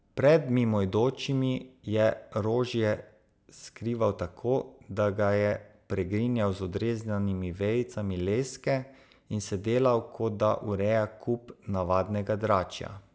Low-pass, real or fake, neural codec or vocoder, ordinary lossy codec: none; real; none; none